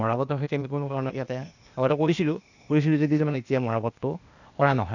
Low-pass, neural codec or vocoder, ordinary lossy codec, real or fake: 7.2 kHz; codec, 16 kHz, 0.8 kbps, ZipCodec; none; fake